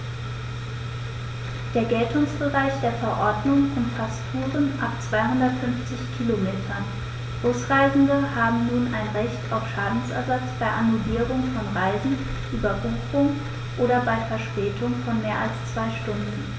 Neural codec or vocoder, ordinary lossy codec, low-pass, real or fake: none; none; none; real